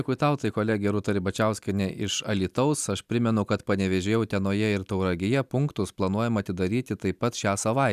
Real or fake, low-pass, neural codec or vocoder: real; 14.4 kHz; none